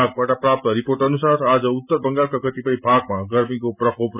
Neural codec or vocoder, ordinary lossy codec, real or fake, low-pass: none; none; real; 3.6 kHz